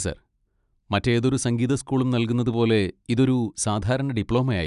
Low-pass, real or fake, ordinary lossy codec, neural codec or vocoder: 10.8 kHz; real; none; none